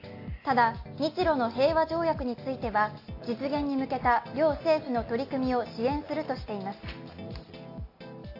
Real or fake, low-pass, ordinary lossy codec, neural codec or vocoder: real; 5.4 kHz; AAC, 32 kbps; none